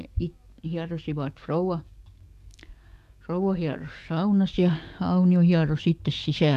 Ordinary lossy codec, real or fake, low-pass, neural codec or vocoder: none; fake; 14.4 kHz; codec, 44.1 kHz, 7.8 kbps, DAC